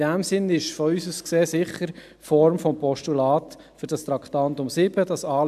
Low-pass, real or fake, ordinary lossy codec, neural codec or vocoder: 14.4 kHz; real; AAC, 96 kbps; none